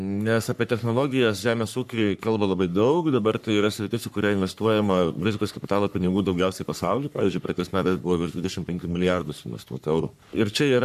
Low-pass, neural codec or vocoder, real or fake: 14.4 kHz; codec, 44.1 kHz, 3.4 kbps, Pupu-Codec; fake